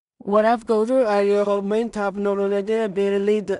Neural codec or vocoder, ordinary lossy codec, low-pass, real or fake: codec, 16 kHz in and 24 kHz out, 0.4 kbps, LongCat-Audio-Codec, two codebook decoder; none; 10.8 kHz; fake